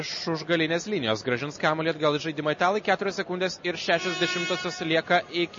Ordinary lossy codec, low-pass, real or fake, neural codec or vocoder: MP3, 32 kbps; 7.2 kHz; real; none